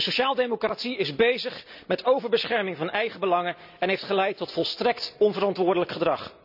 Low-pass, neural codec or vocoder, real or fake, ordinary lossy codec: 5.4 kHz; none; real; none